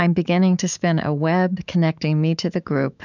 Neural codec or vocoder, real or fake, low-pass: autoencoder, 48 kHz, 128 numbers a frame, DAC-VAE, trained on Japanese speech; fake; 7.2 kHz